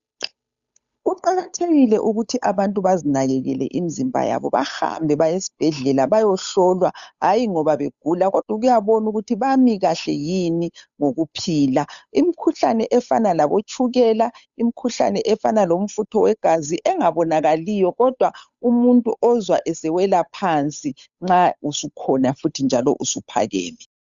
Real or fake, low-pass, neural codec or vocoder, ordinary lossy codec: fake; 7.2 kHz; codec, 16 kHz, 8 kbps, FunCodec, trained on Chinese and English, 25 frames a second; Opus, 64 kbps